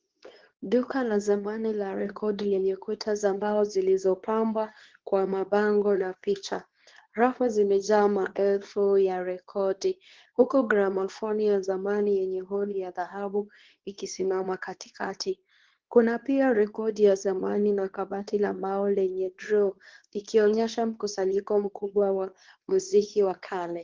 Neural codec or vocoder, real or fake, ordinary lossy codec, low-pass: codec, 24 kHz, 0.9 kbps, WavTokenizer, medium speech release version 2; fake; Opus, 16 kbps; 7.2 kHz